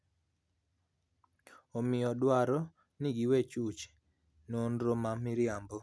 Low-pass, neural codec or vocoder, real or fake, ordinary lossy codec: none; none; real; none